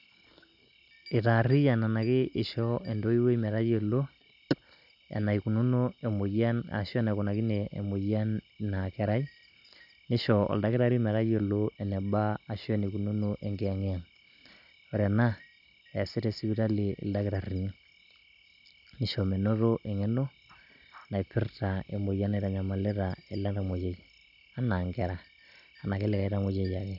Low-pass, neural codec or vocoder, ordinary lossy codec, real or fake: 5.4 kHz; none; none; real